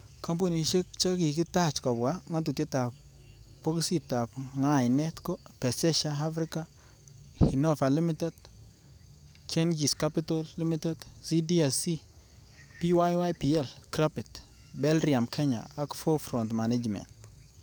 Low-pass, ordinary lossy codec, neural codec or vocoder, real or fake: none; none; codec, 44.1 kHz, 7.8 kbps, DAC; fake